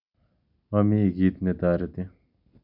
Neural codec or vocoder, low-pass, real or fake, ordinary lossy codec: none; 5.4 kHz; real; none